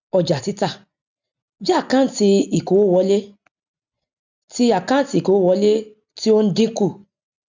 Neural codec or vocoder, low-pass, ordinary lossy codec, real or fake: none; 7.2 kHz; none; real